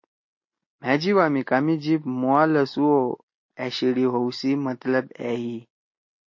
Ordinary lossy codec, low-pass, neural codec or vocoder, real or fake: MP3, 32 kbps; 7.2 kHz; none; real